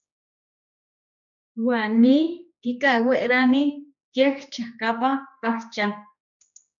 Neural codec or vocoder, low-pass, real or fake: codec, 16 kHz, 2 kbps, X-Codec, HuBERT features, trained on general audio; 7.2 kHz; fake